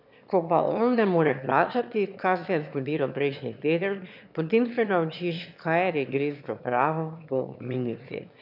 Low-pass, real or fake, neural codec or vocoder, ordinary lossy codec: 5.4 kHz; fake; autoencoder, 22.05 kHz, a latent of 192 numbers a frame, VITS, trained on one speaker; none